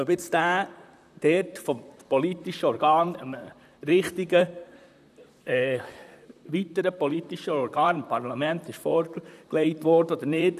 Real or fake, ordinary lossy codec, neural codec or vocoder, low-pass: fake; none; vocoder, 44.1 kHz, 128 mel bands, Pupu-Vocoder; 14.4 kHz